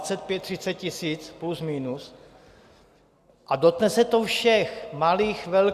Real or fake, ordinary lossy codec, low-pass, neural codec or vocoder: real; Opus, 64 kbps; 14.4 kHz; none